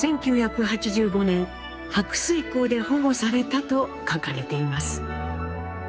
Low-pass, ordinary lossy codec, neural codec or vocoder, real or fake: none; none; codec, 16 kHz, 4 kbps, X-Codec, HuBERT features, trained on general audio; fake